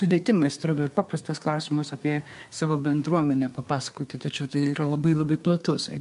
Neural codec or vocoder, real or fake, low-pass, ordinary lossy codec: codec, 24 kHz, 1 kbps, SNAC; fake; 10.8 kHz; MP3, 64 kbps